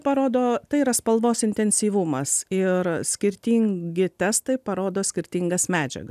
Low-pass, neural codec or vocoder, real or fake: 14.4 kHz; none; real